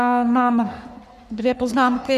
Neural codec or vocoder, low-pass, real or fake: codec, 44.1 kHz, 3.4 kbps, Pupu-Codec; 14.4 kHz; fake